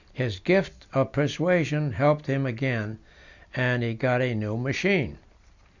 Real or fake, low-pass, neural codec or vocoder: real; 7.2 kHz; none